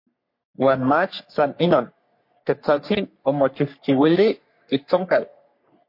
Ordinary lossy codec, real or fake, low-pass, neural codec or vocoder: MP3, 32 kbps; fake; 5.4 kHz; codec, 44.1 kHz, 3.4 kbps, Pupu-Codec